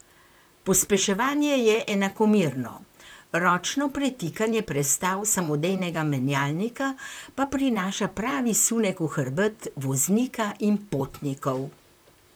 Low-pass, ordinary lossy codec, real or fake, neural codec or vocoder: none; none; fake; vocoder, 44.1 kHz, 128 mel bands, Pupu-Vocoder